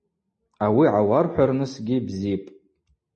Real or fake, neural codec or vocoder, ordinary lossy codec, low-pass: real; none; MP3, 32 kbps; 10.8 kHz